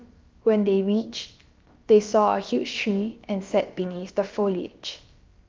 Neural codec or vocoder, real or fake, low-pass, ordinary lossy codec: codec, 16 kHz, about 1 kbps, DyCAST, with the encoder's durations; fake; 7.2 kHz; Opus, 24 kbps